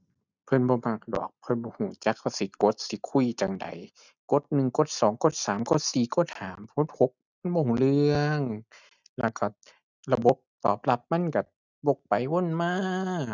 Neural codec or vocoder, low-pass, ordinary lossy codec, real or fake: none; 7.2 kHz; none; real